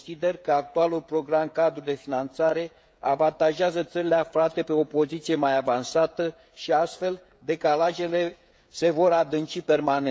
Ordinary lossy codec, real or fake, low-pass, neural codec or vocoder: none; fake; none; codec, 16 kHz, 16 kbps, FreqCodec, smaller model